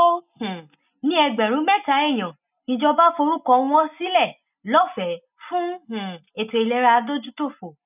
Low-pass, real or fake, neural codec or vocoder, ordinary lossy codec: 3.6 kHz; real; none; none